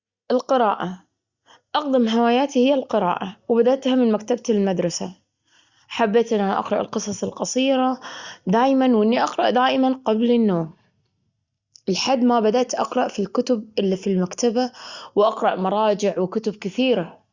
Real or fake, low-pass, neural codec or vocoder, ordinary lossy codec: real; 7.2 kHz; none; Opus, 64 kbps